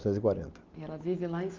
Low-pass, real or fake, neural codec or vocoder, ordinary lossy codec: 7.2 kHz; real; none; Opus, 24 kbps